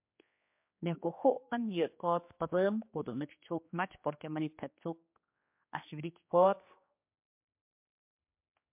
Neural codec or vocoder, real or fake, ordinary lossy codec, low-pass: codec, 16 kHz, 2 kbps, X-Codec, HuBERT features, trained on general audio; fake; MP3, 32 kbps; 3.6 kHz